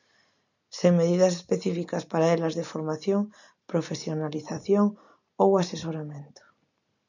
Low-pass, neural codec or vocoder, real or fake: 7.2 kHz; none; real